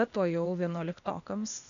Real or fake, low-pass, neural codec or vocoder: fake; 7.2 kHz; codec, 16 kHz, 0.8 kbps, ZipCodec